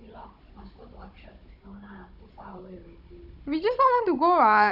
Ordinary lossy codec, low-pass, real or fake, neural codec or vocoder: none; 5.4 kHz; fake; codec, 16 kHz, 16 kbps, FunCodec, trained on Chinese and English, 50 frames a second